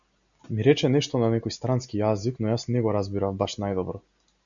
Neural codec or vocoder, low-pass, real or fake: none; 7.2 kHz; real